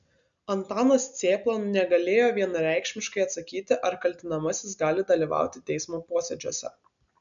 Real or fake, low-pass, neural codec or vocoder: real; 7.2 kHz; none